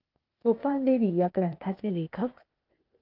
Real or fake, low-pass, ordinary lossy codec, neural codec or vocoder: fake; 5.4 kHz; Opus, 24 kbps; codec, 16 kHz, 0.8 kbps, ZipCodec